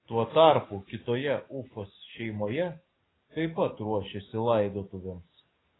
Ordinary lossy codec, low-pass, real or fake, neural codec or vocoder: AAC, 16 kbps; 7.2 kHz; fake; codec, 44.1 kHz, 7.8 kbps, DAC